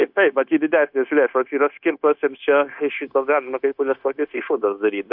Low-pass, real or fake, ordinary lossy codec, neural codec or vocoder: 5.4 kHz; fake; AAC, 48 kbps; codec, 16 kHz, 0.9 kbps, LongCat-Audio-Codec